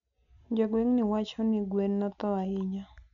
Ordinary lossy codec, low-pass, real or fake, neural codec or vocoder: none; 7.2 kHz; real; none